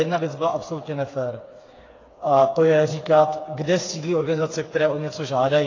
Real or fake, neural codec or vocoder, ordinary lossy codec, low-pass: fake; codec, 16 kHz, 4 kbps, FreqCodec, smaller model; AAC, 32 kbps; 7.2 kHz